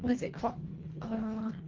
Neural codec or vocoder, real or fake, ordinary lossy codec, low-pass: codec, 24 kHz, 1.5 kbps, HILCodec; fake; Opus, 16 kbps; 7.2 kHz